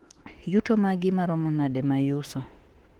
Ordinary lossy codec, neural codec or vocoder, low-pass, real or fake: Opus, 16 kbps; autoencoder, 48 kHz, 32 numbers a frame, DAC-VAE, trained on Japanese speech; 19.8 kHz; fake